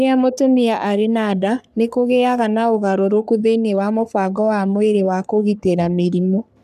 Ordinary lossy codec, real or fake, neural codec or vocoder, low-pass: none; fake; codec, 44.1 kHz, 3.4 kbps, Pupu-Codec; 14.4 kHz